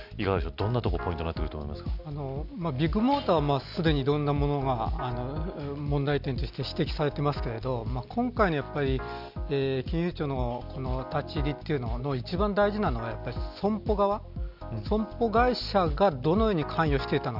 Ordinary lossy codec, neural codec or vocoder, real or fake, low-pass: none; none; real; 5.4 kHz